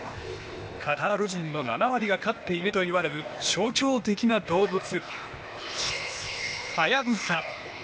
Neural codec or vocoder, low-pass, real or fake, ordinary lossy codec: codec, 16 kHz, 0.8 kbps, ZipCodec; none; fake; none